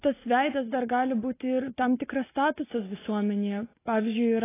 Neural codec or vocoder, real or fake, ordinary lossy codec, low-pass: none; real; AAC, 16 kbps; 3.6 kHz